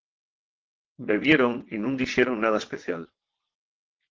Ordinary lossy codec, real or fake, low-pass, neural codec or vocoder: Opus, 16 kbps; fake; 7.2 kHz; vocoder, 22.05 kHz, 80 mel bands, WaveNeXt